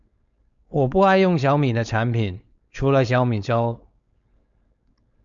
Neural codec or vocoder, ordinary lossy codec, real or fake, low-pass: codec, 16 kHz, 4.8 kbps, FACodec; MP3, 96 kbps; fake; 7.2 kHz